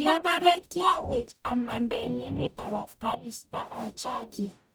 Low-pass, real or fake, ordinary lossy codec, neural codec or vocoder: none; fake; none; codec, 44.1 kHz, 0.9 kbps, DAC